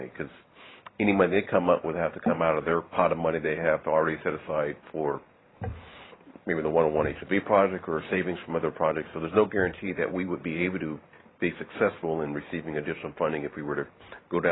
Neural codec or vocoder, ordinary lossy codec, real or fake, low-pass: vocoder, 44.1 kHz, 128 mel bands every 512 samples, BigVGAN v2; AAC, 16 kbps; fake; 7.2 kHz